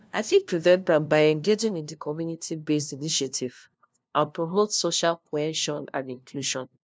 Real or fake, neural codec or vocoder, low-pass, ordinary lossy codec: fake; codec, 16 kHz, 0.5 kbps, FunCodec, trained on LibriTTS, 25 frames a second; none; none